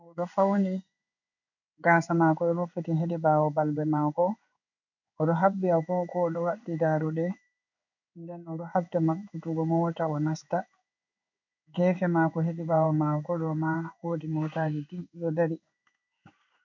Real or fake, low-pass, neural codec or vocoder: fake; 7.2 kHz; codec, 16 kHz in and 24 kHz out, 1 kbps, XY-Tokenizer